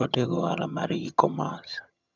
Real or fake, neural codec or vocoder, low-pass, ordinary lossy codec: fake; vocoder, 22.05 kHz, 80 mel bands, HiFi-GAN; 7.2 kHz; none